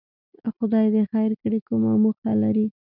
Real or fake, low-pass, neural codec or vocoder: real; 5.4 kHz; none